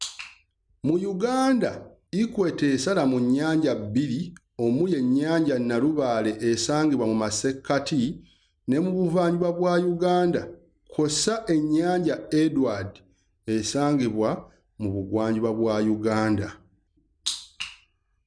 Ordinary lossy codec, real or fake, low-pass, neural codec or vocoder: none; real; 9.9 kHz; none